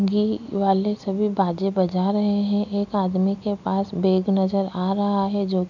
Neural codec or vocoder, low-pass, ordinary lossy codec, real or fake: none; 7.2 kHz; none; real